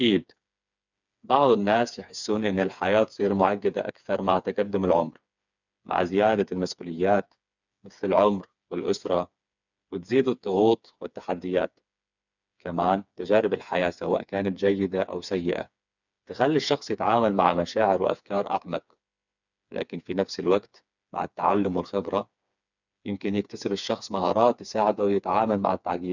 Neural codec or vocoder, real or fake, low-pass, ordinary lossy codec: codec, 16 kHz, 4 kbps, FreqCodec, smaller model; fake; 7.2 kHz; none